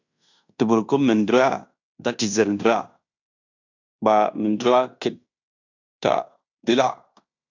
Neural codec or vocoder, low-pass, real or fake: codec, 16 kHz in and 24 kHz out, 0.9 kbps, LongCat-Audio-Codec, fine tuned four codebook decoder; 7.2 kHz; fake